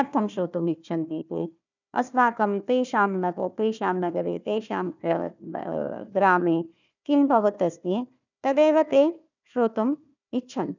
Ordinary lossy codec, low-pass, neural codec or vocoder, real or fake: none; 7.2 kHz; codec, 16 kHz, 1 kbps, FunCodec, trained on Chinese and English, 50 frames a second; fake